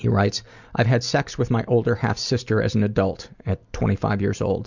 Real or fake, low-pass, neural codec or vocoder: real; 7.2 kHz; none